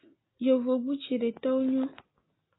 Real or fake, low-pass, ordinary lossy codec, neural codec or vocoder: real; 7.2 kHz; AAC, 16 kbps; none